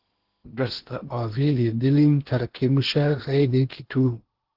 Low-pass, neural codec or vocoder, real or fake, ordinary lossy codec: 5.4 kHz; codec, 16 kHz in and 24 kHz out, 0.8 kbps, FocalCodec, streaming, 65536 codes; fake; Opus, 16 kbps